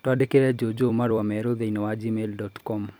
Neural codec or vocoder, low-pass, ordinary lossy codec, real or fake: vocoder, 44.1 kHz, 128 mel bands every 256 samples, BigVGAN v2; none; none; fake